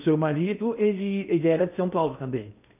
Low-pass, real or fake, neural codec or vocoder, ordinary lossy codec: 3.6 kHz; fake; codec, 16 kHz in and 24 kHz out, 0.6 kbps, FocalCodec, streaming, 2048 codes; none